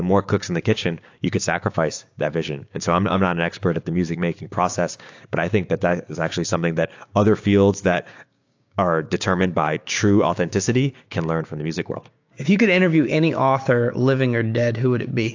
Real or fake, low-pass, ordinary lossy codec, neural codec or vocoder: fake; 7.2 kHz; AAC, 48 kbps; codec, 16 kHz, 6 kbps, DAC